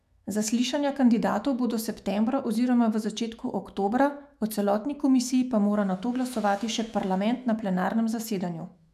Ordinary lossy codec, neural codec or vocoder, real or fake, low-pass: none; autoencoder, 48 kHz, 128 numbers a frame, DAC-VAE, trained on Japanese speech; fake; 14.4 kHz